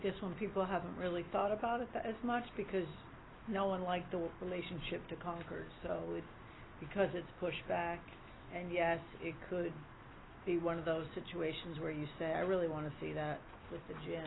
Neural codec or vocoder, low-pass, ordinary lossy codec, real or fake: none; 7.2 kHz; AAC, 16 kbps; real